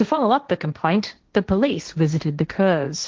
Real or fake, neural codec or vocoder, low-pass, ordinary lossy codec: fake; codec, 16 kHz, 1.1 kbps, Voila-Tokenizer; 7.2 kHz; Opus, 16 kbps